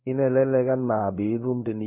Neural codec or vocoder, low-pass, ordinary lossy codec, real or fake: codec, 16 kHz in and 24 kHz out, 1 kbps, XY-Tokenizer; 3.6 kHz; none; fake